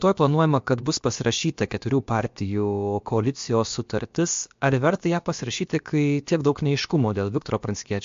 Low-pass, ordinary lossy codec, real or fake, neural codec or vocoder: 7.2 kHz; MP3, 48 kbps; fake; codec, 16 kHz, about 1 kbps, DyCAST, with the encoder's durations